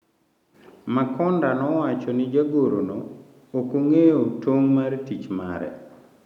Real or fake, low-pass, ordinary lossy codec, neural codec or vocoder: real; 19.8 kHz; none; none